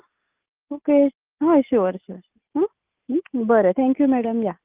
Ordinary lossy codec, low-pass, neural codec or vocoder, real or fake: Opus, 24 kbps; 3.6 kHz; none; real